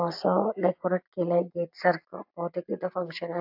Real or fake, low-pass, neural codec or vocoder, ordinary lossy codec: real; 5.4 kHz; none; AAC, 48 kbps